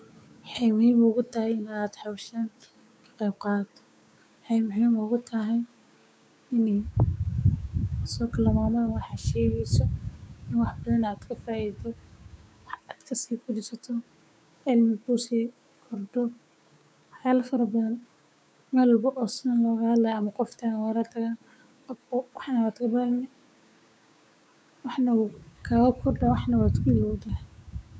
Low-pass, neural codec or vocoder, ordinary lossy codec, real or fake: none; codec, 16 kHz, 6 kbps, DAC; none; fake